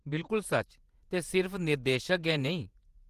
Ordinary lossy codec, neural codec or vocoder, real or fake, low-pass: Opus, 24 kbps; vocoder, 48 kHz, 128 mel bands, Vocos; fake; 14.4 kHz